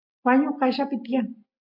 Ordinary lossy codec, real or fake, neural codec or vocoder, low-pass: MP3, 48 kbps; real; none; 5.4 kHz